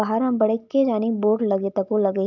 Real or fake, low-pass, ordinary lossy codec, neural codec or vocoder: real; 7.2 kHz; none; none